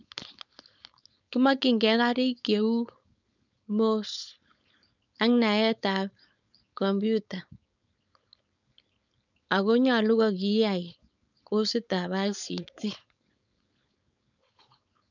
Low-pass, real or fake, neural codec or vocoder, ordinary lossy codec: 7.2 kHz; fake; codec, 16 kHz, 4.8 kbps, FACodec; none